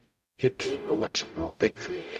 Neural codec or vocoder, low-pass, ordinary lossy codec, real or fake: codec, 44.1 kHz, 0.9 kbps, DAC; 14.4 kHz; none; fake